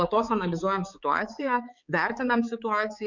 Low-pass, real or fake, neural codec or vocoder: 7.2 kHz; fake; codec, 16 kHz, 4 kbps, X-Codec, HuBERT features, trained on balanced general audio